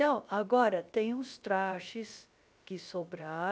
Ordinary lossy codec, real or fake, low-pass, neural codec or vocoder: none; fake; none; codec, 16 kHz, about 1 kbps, DyCAST, with the encoder's durations